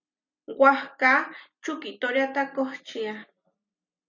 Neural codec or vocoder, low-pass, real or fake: none; 7.2 kHz; real